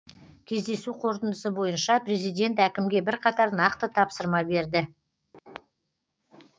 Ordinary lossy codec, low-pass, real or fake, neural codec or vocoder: none; none; fake; codec, 16 kHz, 6 kbps, DAC